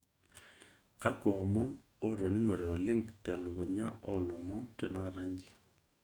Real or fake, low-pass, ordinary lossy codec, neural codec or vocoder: fake; 19.8 kHz; none; codec, 44.1 kHz, 2.6 kbps, DAC